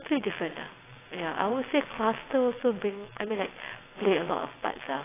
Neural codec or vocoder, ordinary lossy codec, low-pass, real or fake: vocoder, 22.05 kHz, 80 mel bands, WaveNeXt; AAC, 16 kbps; 3.6 kHz; fake